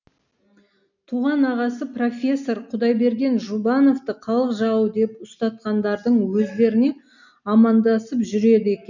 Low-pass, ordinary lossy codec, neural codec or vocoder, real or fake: 7.2 kHz; none; none; real